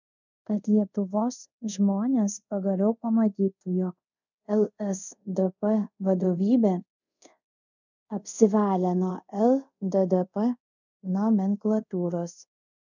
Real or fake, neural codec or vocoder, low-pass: fake; codec, 24 kHz, 0.5 kbps, DualCodec; 7.2 kHz